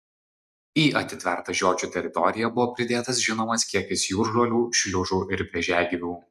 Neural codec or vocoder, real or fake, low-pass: none; real; 14.4 kHz